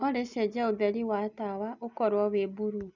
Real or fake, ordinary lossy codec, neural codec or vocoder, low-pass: real; none; none; 7.2 kHz